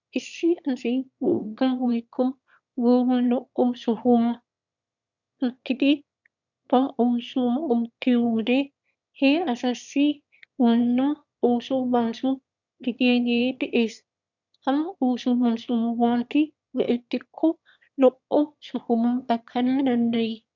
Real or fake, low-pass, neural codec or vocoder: fake; 7.2 kHz; autoencoder, 22.05 kHz, a latent of 192 numbers a frame, VITS, trained on one speaker